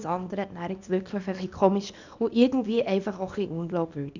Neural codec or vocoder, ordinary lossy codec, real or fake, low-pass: codec, 24 kHz, 0.9 kbps, WavTokenizer, small release; none; fake; 7.2 kHz